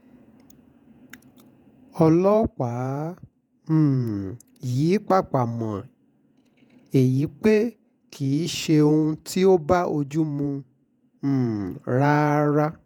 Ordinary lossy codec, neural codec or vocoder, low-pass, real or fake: none; vocoder, 48 kHz, 128 mel bands, Vocos; 19.8 kHz; fake